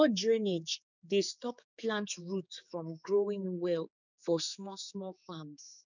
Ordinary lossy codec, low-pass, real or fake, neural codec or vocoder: none; 7.2 kHz; fake; codec, 16 kHz, 4 kbps, X-Codec, HuBERT features, trained on general audio